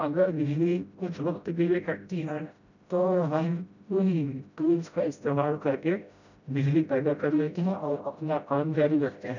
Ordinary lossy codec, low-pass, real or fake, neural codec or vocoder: none; 7.2 kHz; fake; codec, 16 kHz, 0.5 kbps, FreqCodec, smaller model